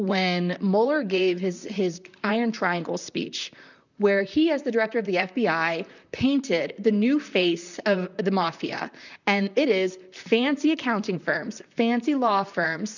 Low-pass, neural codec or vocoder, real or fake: 7.2 kHz; vocoder, 44.1 kHz, 128 mel bands, Pupu-Vocoder; fake